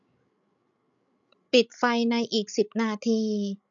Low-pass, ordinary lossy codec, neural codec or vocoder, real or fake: 7.2 kHz; none; codec, 16 kHz, 16 kbps, FreqCodec, larger model; fake